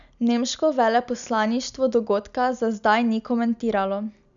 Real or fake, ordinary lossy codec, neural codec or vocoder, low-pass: real; none; none; 7.2 kHz